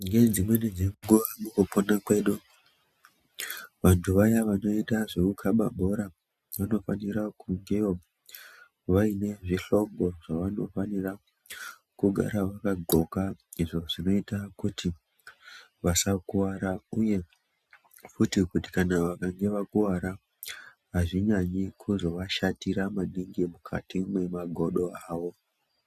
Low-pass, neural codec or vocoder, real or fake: 14.4 kHz; none; real